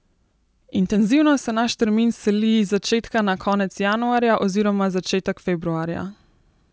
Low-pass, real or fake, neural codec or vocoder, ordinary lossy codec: none; real; none; none